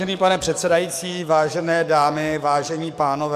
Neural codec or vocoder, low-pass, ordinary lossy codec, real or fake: codec, 44.1 kHz, 7.8 kbps, DAC; 14.4 kHz; MP3, 96 kbps; fake